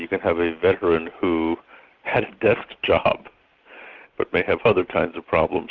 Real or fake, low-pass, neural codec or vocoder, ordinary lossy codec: real; 7.2 kHz; none; Opus, 32 kbps